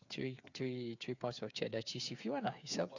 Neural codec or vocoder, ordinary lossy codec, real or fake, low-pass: codec, 16 kHz, 8 kbps, FreqCodec, smaller model; none; fake; 7.2 kHz